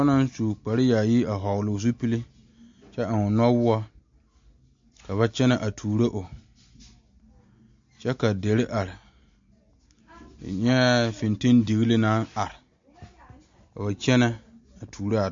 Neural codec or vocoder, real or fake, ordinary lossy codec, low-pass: none; real; MP3, 48 kbps; 7.2 kHz